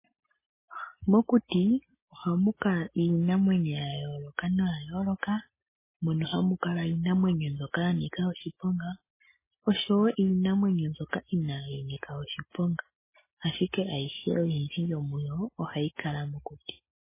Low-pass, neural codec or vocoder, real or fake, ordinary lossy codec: 3.6 kHz; none; real; MP3, 16 kbps